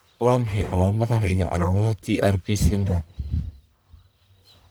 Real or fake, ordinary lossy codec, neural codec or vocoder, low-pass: fake; none; codec, 44.1 kHz, 1.7 kbps, Pupu-Codec; none